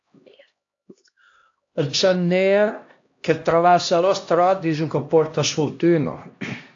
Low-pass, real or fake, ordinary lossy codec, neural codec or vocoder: 7.2 kHz; fake; AAC, 48 kbps; codec, 16 kHz, 1 kbps, X-Codec, HuBERT features, trained on LibriSpeech